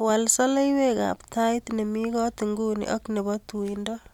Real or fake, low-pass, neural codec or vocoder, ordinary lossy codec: real; 19.8 kHz; none; none